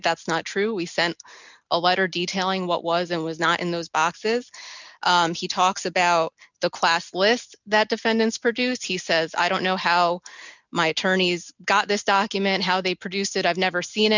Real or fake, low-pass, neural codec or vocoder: real; 7.2 kHz; none